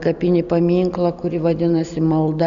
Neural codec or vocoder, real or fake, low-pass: none; real; 7.2 kHz